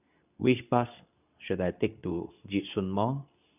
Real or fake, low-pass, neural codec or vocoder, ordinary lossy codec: fake; 3.6 kHz; codec, 24 kHz, 0.9 kbps, WavTokenizer, medium speech release version 2; none